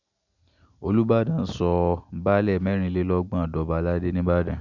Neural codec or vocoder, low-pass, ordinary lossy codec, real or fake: none; 7.2 kHz; none; real